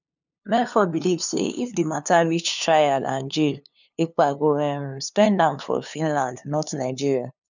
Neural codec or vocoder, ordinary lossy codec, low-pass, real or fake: codec, 16 kHz, 2 kbps, FunCodec, trained on LibriTTS, 25 frames a second; none; 7.2 kHz; fake